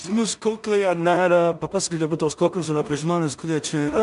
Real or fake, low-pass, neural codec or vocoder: fake; 10.8 kHz; codec, 16 kHz in and 24 kHz out, 0.4 kbps, LongCat-Audio-Codec, two codebook decoder